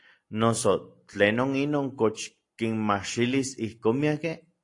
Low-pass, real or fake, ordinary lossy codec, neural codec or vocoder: 10.8 kHz; real; AAC, 48 kbps; none